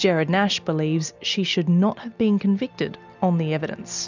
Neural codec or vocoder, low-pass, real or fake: none; 7.2 kHz; real